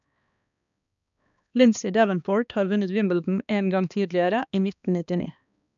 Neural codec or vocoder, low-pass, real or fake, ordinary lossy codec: codec, 16 kHz, 2 kbps, X-Codec, HuBERT features, trained on balanced general audio; 7.2 kHz; fake; none